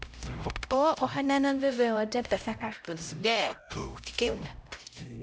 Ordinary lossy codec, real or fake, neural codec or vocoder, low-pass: none; fake; codec, 16 kHz, 0.5 kbps, X-Codec, HuBERT features, trained on LibriSpeech; none